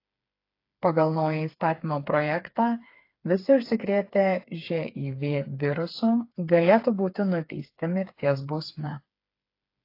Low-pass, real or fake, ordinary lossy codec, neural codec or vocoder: 5.4 kHz; fake; AAC, 32 kbps; codec, 16 kHz, 4 kbps, FreqCodec, smaller model